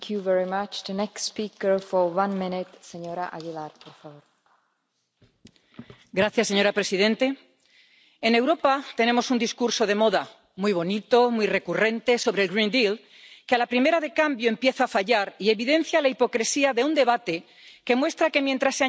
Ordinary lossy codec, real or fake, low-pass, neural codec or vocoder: none; real; none; none